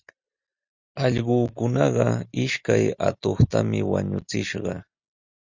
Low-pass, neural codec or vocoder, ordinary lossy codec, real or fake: 7.2 kHz; none; Opus, 64 kbps; real